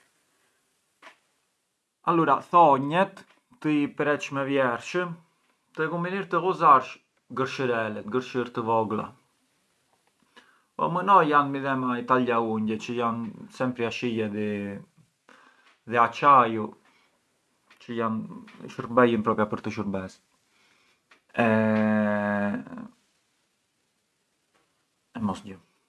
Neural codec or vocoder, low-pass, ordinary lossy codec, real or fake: none; none; none; real